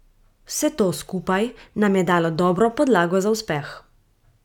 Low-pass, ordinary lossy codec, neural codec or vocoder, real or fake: 19.8 kHz; none; none; real